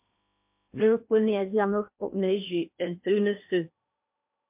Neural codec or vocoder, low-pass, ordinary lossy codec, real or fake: codec, 16 kHz in and 24 kHz out, 0.8 kbps, FocalCodec, streaming, 65536 codes; 3.6 kHz; MP3, 32 kbps; fake